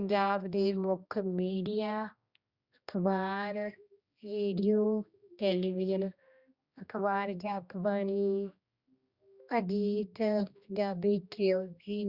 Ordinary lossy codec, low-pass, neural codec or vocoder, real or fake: Opus, 64 kbps; 5.4 kHz; codec, 16 kHz, 1 kbps, X-Codec, HuBERT features, trained on general audio; fake